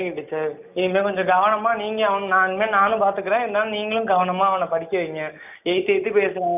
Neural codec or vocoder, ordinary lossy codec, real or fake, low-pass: none; none; real; 3.6 kHz